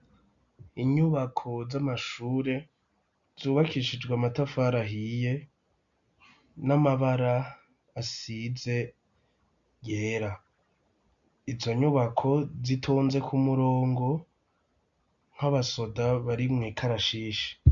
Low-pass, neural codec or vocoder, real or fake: 7.2 kHz; none; real